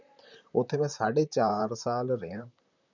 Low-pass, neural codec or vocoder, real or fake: 7.2 kHz; vocoder, 44.1 kHz, 128 mel bands, Pupu-Vocoder; fake